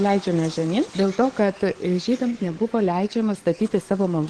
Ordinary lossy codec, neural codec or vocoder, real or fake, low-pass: Opus, 16 kbps; codec, 44.1 kHz, 7.8 kbps, DAC; fake; 10.8 kHz